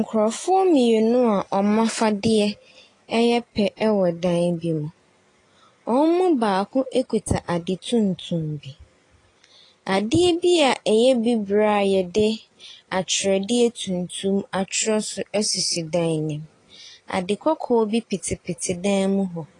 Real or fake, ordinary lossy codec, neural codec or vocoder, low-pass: real; AAC, 32 kbps; none; 10.8 kHz